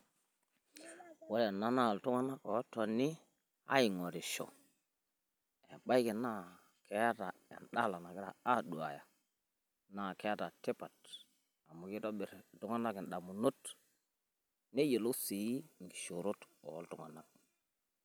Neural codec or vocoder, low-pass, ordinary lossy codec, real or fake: none; none; none; real